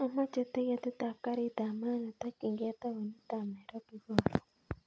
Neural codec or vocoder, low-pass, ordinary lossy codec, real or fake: none; none; none; real